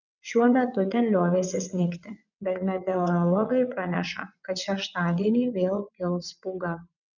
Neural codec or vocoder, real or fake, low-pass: vocoder, 22.05 kHz, 80 mel bands, WaveNeXt; fake; 7.2 kHz